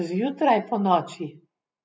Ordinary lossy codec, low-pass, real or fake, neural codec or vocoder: none; none; real; none